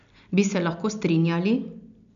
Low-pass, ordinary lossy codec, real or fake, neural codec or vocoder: 7.2 kHz; none; real; none